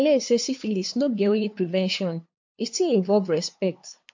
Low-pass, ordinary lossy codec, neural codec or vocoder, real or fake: 7.2 kHz; MP3, 48 kbps; codec, 16 kHz, 2 kbps, FunCodec, trained on LibriTTS, 25 frames a second; fake